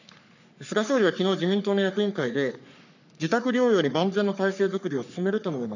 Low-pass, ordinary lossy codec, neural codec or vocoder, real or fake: 7.2 kHz; none; codec, 44.1 kHz, 3.4 kbps, Pupu-Codec; fake